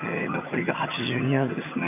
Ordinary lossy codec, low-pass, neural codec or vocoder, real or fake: none; 3.6 kHz; vocoder, 22.05 kHz, 80 mel bands, HiFi-GAN; fake